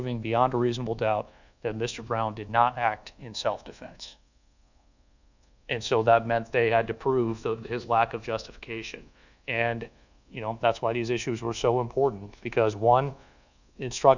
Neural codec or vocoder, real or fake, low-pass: codec, 24 kHz, 1.2 kbps, DualCodec; fake; 7.2 kHz